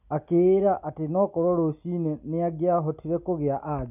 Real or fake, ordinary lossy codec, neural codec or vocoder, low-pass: real; none; none; 3.6 kHz